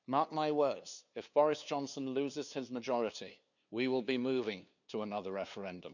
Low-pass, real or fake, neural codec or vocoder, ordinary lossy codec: 7.2 kHz; fake; codec, 16 kHz, 2 kbps, FunCodec, trained on LibriTTS, 25 frames a second; none